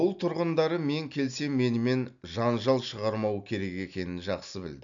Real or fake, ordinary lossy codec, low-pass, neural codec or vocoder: real; none; 7.2 kHz; none